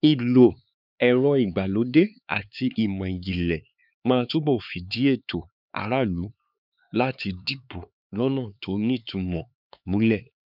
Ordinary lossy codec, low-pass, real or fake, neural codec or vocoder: none; 5.4 kHz; fake; codec, 16 kHz, 4 kbps, X-Codec, HuBERT features, trained on LibriSpeech